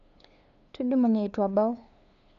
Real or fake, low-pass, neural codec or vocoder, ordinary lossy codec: fake; 7.2 kHz; codec, 16 kHz, 2 kbps, FunCodec, trained on LibriTTS, 25 frames a second; none